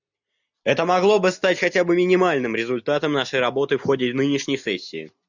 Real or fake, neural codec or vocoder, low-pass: real; none; 7.2 kHz